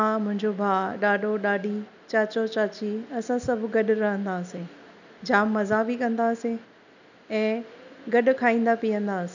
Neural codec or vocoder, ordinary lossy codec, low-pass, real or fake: none; none; 7.2 kHz; real